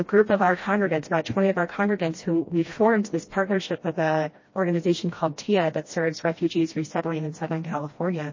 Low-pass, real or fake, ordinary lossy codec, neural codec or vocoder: 7.2 kHz; fake; MP3, 32 kbps; codec, 16 kHz, 1 kbps, FreqCodec, smaller model